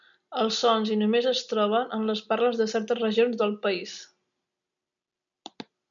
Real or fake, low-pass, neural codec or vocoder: real; 7.2 kHz; none